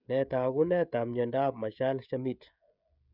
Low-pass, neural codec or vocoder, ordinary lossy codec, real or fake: 5.4 kHz; codec, 16 kHz, 16 kbps, FreqCodec, smaller model; none; fake